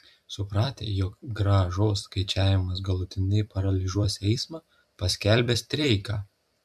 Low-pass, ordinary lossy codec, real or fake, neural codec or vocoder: 14.4 kHz; MP3, 96 kbps; fake; vocoder, 44.1 kHz, 128 mel bands every 256 samples, BigVGAN v2